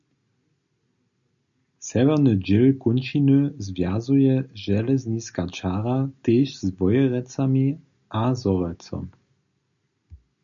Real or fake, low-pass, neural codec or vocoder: real; 7.2 kHz; none